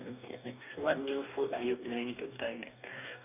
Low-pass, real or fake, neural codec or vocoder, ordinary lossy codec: 3.6 kHz; fake; codec, 44.1 kHz, 2.6 kbps, DAC; none